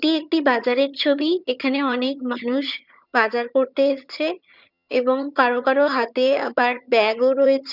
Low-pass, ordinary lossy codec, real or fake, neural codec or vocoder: 5.4 kHz; none; fake; vocoder, 22.05 kHz, 80 mel bands, HiFi-GAN